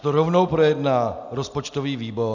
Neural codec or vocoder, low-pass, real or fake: none; 7.2 kHz; real